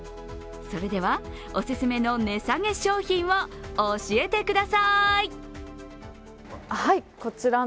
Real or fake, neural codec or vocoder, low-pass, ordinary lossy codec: real; none; none; none